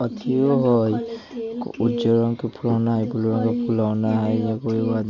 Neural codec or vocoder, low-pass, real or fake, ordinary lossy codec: none; 7.2 kHz; real; none